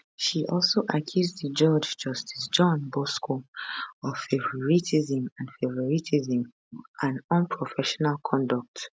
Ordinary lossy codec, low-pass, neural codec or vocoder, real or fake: none; none; none; real